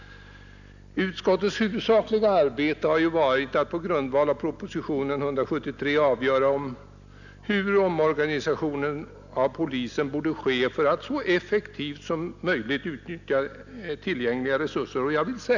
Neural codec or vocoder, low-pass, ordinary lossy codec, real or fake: none; 7.2 kHz; none; real